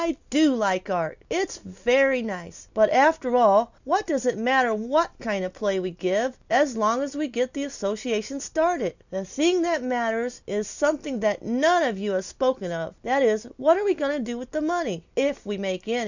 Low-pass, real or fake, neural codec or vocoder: 7.2 kHz; real; none